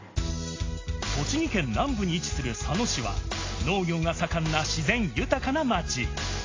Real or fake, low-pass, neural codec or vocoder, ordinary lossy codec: real; 7.2 kHz; none; AAC, 32 kbps